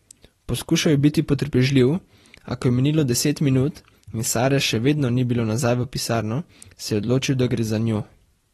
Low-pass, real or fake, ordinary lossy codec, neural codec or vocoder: 19.8 kHz; fake; AAC, 32 kbps; vocoder, 44.1 kHz, 128 mel bands every 256 samples, BigVGAN v2